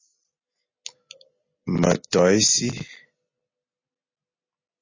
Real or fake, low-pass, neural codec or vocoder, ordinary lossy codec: real; 7.2 kHz; none; MP3, 32 kbps